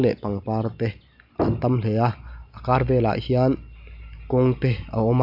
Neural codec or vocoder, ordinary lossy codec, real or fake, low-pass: none; none; real; 5.4 kHz